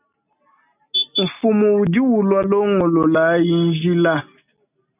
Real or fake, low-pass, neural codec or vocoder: real; 3.6 kHz; none